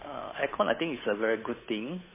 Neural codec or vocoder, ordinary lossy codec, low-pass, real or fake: codec, 16 kHz, 8 kbps, FunCodec, trained on Chinese and English, 25 frames a second; MP3, 16 kbps; 3.6 kHz; fake